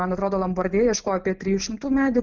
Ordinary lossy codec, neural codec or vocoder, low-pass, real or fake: Opus, 24 kbps; none; 7.2 kHz; real